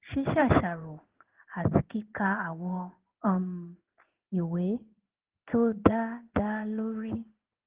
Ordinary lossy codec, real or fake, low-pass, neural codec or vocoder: Opus, 16 kbps; fake; 3.6 kHz; codec, 16 kHz in and 24 kHz out, 1 kbps, XY-Tokenizer